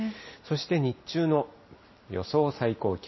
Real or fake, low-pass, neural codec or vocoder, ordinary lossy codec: real; 7.2 kHz; none; MP3, 24 kbps